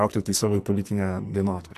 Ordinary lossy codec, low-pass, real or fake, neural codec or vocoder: MP3, 96 kbps; 14.4 kHz; fake; codec, 44.1 kHz, 2.6 kbps, SNAC